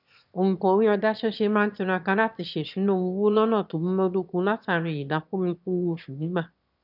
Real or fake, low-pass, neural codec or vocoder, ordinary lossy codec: fake; 5.4 kHz; autoencoder, 22.05 kHz, a latent of 192 numbers a frame, VITS, trained on one speaker; none